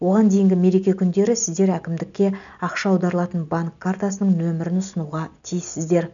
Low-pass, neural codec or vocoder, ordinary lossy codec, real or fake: 7.2 kHz; none; none; real